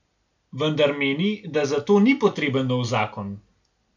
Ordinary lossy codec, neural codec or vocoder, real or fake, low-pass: none; none; real; 7.2 kHz